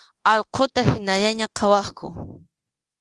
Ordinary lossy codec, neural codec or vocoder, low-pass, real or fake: Opus, 24 kbps; codec, 24 kHz, 0.9 kbps, DualCodec; 10.8 kHz; fake